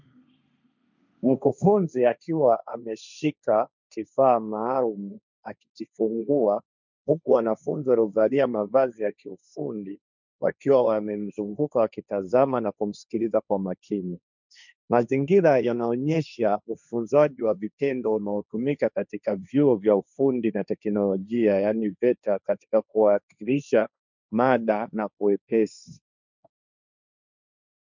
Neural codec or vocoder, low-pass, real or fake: codec, 16 kHz, 1.1 kbps, Voila-Tokenizer; 7.2 kHz; fake